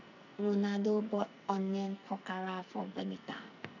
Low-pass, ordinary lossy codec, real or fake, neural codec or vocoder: 7.2 kHz; none; fake; codec, 44.1 kHz, 2.6 kbps, SNAC